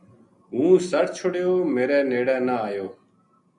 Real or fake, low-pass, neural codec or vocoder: real; 10.8 kHz; none